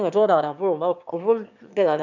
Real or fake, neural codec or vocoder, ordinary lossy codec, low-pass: fake; autoencoder, 22.05 kHz, a latent of 192 numbers a frame, VITS, trained on one speaker; none; 7.2 kHz